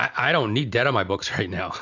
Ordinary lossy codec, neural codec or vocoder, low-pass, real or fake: AAC, 48 kbps; none; 7.2 kHz; real